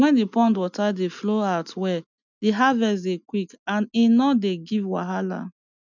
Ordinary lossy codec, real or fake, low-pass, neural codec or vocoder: none; real; 7.2 kHz; none